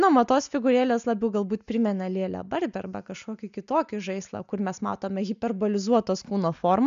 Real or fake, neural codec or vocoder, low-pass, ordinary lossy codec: real; none; 7.2 kHz; AAC, 96 kbps